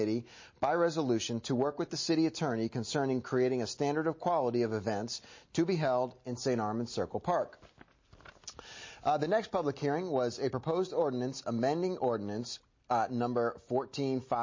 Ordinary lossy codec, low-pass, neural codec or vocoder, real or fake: MP3, 32 kbps; 7.2 kHz; none; real